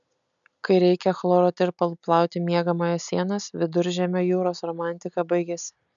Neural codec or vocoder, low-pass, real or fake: none; 7.2 kHz; real